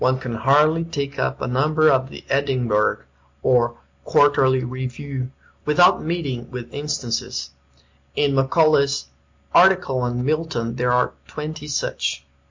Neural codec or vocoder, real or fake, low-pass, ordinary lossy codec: none; real; 7.2 kHz; MP3, 48 kbps